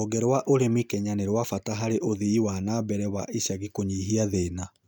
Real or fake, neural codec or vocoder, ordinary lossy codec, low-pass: real; none; none; none